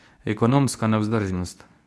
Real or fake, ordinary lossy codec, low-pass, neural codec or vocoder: fake; none; none; codec, 24 kHz, 0.9 kbps, WavTokenizer, medium speech release version 2